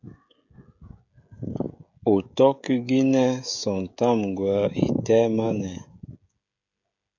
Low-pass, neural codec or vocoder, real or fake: 7.2 kHz; codec, 16 kHz, 16 kbps, FreqCodec, smaller model; fake